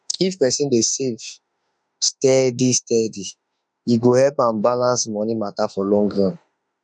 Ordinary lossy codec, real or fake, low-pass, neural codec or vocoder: none; fake; 9.9 kHz; autoencoder, 48 kHz, 32 numbers a frame, DAC-VAE, trained on Japanese speech